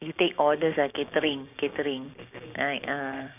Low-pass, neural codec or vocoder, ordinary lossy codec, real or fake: 3.6 kHz; codec, 16 kHz, 6 kbps, DAC; none; fake